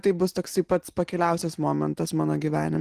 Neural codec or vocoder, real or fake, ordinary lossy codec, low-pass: none; real; Opus, 16 kbps; 14.4 kHz